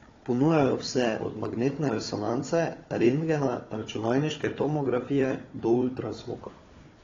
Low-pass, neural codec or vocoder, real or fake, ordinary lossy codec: 7.2 kHz; codec, 16 kHz, 4 kbps, FunCodec, trained on Chinese and English, 50 frames a second; fake; AAC, 32 kbps